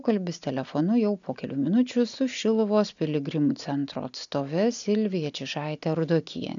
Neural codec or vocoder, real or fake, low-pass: none; real; 7.2 kHz